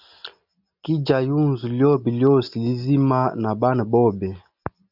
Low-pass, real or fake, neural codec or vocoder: 5.4 kHz; real; none